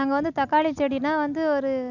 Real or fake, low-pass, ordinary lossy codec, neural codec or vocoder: real; 7.2 kHz; none; none